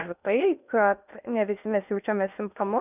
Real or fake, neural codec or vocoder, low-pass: fake; codec, 16 kHz in and 24 kHz out, 0.6 kbps, FocalCodec, streaming, 2048 codes; 3.6 kHz